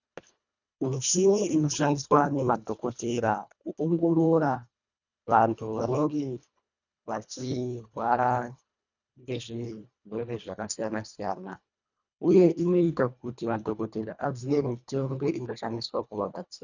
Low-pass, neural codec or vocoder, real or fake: 7.2 kHz; codec, 24 kHz, 1.5 kbps, HILCodec; fake